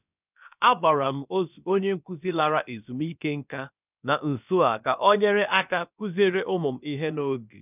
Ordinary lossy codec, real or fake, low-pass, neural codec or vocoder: none; fake; 3.6 kHz; codec, 16 kHz, about 1 kbps, DyCAST, with the encoder's durations